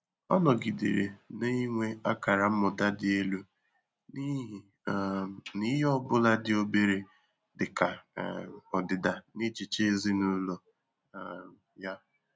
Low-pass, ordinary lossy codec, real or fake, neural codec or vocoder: none; none; real; none